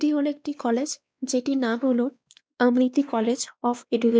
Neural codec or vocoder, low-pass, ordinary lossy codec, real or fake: codec, 16 kHz, 2 kbps, X-Codec, WavLM features, trained on Multilingual LibriSpeech; none; none; fake